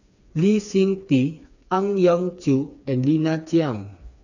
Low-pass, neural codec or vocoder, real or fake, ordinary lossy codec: 7.2 kHz; codec, 16 kHz, 4 kbps, FreqCodec, smaller model; fake; none